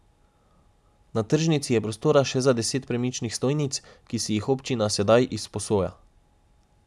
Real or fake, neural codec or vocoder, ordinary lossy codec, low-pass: real; none; none; none